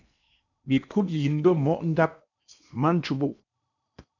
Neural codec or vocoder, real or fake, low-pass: codec, 16 kHz in and 24 kHz out, 0.8 kbps, FocalCodec, streaming, 65536 codes; fake; 7.2 kHz